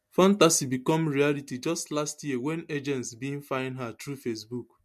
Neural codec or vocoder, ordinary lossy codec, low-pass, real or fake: none; MP3, 64 kbps; 14.4 kHz; real